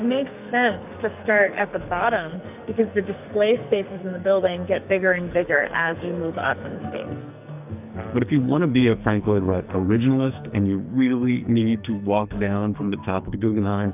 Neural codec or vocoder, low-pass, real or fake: codec, 44.1 kHz, 2.6 kbps, SNAC; 3.6 kHz; fake